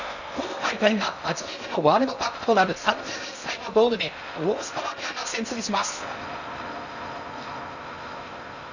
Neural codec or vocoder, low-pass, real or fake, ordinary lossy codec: codec, 16 kHz in and 24 kHz out, 0.6 kbps, FocalCodec, streaming, 4096 codes; 7.2 kHz; fake; none